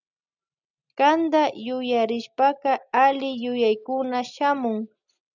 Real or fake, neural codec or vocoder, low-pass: real; none; 7.2 kHz